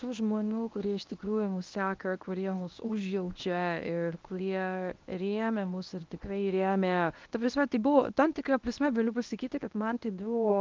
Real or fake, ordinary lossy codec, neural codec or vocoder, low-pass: fake; Opus, 24 kbps; codec, 24 kHz, 0.9 kbps, WavTokenizer, medium speech release version 1; 7.2 kHz